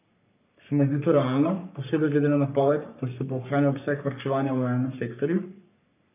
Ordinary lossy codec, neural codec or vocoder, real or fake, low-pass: none; codec, 44.1 kHz, 3.4 kbps, Pupu-Codec; fake; 3.6 kHz